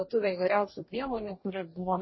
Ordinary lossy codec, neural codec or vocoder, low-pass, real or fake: MP3, 24 kbps; codec, 44.1 kHz, 2.6 kbps, DAC; 7.2 kHz; fake